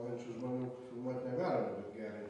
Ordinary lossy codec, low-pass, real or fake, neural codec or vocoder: AAC, 32 kbps; 10.8 kHz; real; none